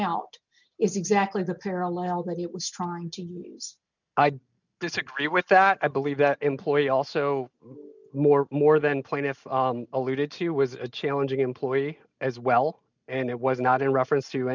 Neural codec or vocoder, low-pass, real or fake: none; 7.2 kHz; real